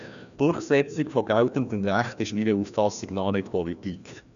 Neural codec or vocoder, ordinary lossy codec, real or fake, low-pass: codec, 16 kHz, 1 kbps, FreqCodec, larger model; none; fake; 7.2 kHz